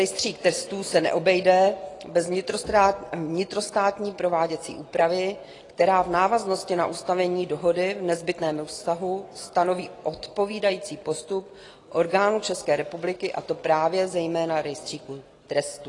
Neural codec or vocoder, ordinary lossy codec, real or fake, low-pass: none; AAC, 32 kbps; real; 10.8 kHz